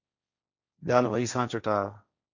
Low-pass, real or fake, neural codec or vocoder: 7.2 kHz; fake; codec, 16 kHz, 1.1 kbps, Voila-Tokenizer